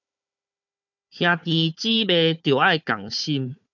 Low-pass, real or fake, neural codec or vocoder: 7.2 kHz; fake; codec, 16 kHz, 4 kbps, FunCodec, trained on Chinese and English, 50 frames a second